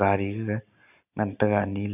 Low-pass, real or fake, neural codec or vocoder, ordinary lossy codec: 3.6 kHz; real; none; none